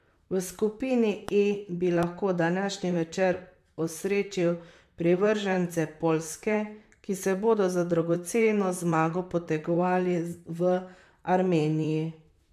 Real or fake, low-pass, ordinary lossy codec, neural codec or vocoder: fake; 14.4 kHz; AAC, 96 kbps; vocoder, 44.1 kHz, 128 mel bands, Pupu-Vocoder